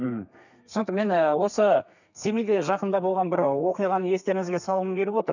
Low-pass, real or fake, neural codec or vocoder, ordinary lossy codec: 7.2 kHz; fake; codec, 32 kHz, 1.9 kbps, SNAC; none